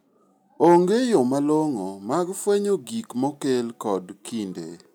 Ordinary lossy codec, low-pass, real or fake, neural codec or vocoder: none; none; real; none